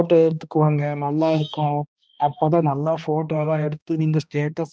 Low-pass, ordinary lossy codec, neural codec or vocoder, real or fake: none; none; codec, 16 kHz, 1 kbps, X-Codec, HuBERT features, trained on balanced general audio; fake